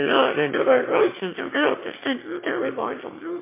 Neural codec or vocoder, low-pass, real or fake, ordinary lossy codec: autoencoder, 22.05 kHz, a latent of 192 numbers a frame, VITS, trained on one speaker; 3.6 kHz; fake; MP3, 24 kbps